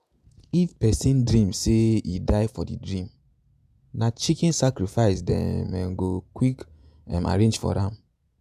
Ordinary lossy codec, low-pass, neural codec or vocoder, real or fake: none; 14.4 kHz; vocoder, 48 kHz, 128 mel bands, Vocos; fake